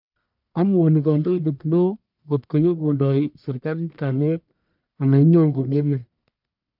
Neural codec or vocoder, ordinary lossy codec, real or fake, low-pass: codec, 44.1 kHz, 1.7 kbps, Pupu-Codec; none; fake; 5.4 kHz